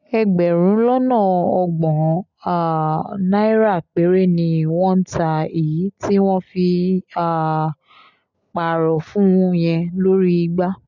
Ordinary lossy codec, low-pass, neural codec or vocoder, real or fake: none; 7.2 kHz; none; real